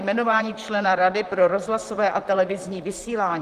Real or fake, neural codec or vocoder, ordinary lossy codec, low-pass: fake; vocoder, 44.1 kHz, 128 mel bands, Pupu-Vocoder; Opus, 32 kbps; 14.4 kHz